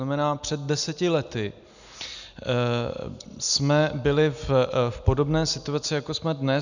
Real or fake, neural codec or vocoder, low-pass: real; none; 7.2 kHz